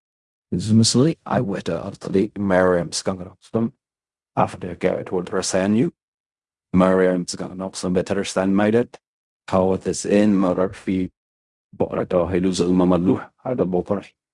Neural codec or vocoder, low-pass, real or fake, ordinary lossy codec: codec, 16 kHz in and 24 kHz out, 0.4 kbps, LongCat-Audio-Codec, fine tuned four codebook decoder; 10.8 kHz; fake; Opus, 64 kbps